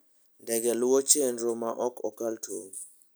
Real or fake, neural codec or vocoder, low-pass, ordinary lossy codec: real; none; none; none